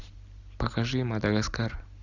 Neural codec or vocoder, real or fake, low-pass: none; real; 7.2 kHz